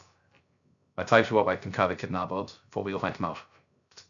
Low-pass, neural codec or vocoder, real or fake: 7.2 kHz; codec, 16 kHz, 0.3 kbps, FocalCodec; fake